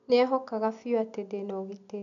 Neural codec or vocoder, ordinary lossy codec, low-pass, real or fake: none; none; 7.2 kHz; real